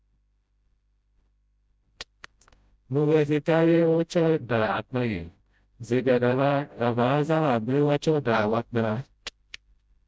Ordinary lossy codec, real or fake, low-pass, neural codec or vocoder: none; fake; none; codec, 16 kHz, 0.5 kbps, FreqCodec, smaller model